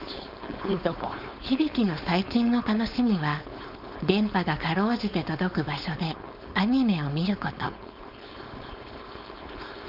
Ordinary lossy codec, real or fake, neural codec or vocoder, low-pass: none; fake; codec, 16 kHz, 4.8 kbps, FACodec; 5.4 kHz